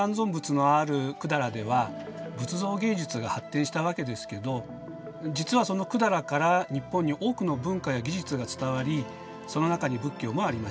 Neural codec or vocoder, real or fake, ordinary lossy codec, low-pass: none; real; none; none